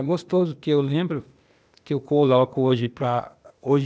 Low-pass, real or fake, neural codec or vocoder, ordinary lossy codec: none; fake; codec, 16 kHz, 0.8 kbps, ZipCodec; none